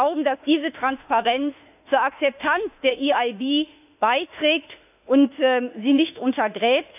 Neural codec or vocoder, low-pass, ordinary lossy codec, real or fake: autoencoder, 48 kHz, 32 numbers a frame, DAC-VAE, trained on Japanese speech; 3.6 kHz; none; fake